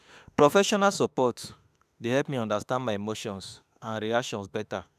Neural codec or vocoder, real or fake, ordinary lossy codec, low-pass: autoencoder, 48 kHz, 32 numbers a frame, DAC-VAE, trained on Japanese speech; fake; none; 14.4 kHz